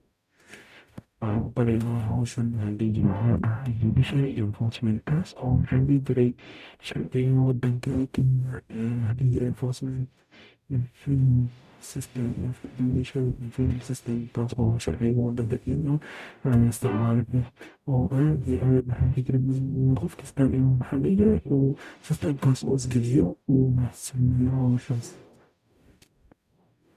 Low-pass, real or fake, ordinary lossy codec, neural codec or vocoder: 14.4 kHz; fake; none; codec, 44.1 kHz, 0.9 kbps, DAC